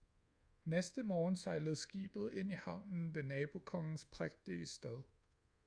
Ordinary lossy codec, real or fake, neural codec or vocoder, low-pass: Opus, 64 kbps; fake; codec, 24 kHz, 1.2 kbps, DualCodec; 9.9 kHz